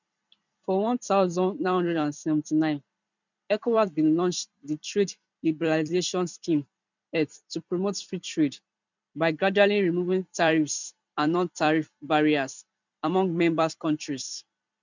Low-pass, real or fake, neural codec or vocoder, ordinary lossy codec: 7.2 kHz; fake; vocoder, 44.1 kHz, 128 mel bands every 256 samples, BigVGAN v2; none